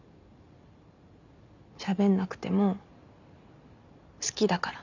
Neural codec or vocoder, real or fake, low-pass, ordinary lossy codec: none; real; 7.2 kHz; none